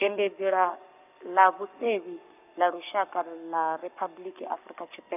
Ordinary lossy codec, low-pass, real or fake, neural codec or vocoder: none; 3.6 kHz; fake; codec, 44.1 kHz, 7.8 kbps, Pupu-Codec